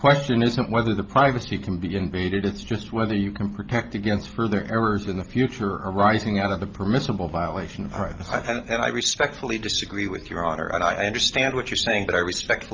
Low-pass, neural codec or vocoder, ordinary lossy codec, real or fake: 7.2 kHz; none; Opus, 24 kbps; real